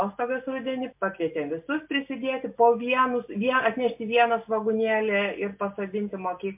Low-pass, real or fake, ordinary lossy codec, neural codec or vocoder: 3.6 kHz; real; MP3, 32 kbps; none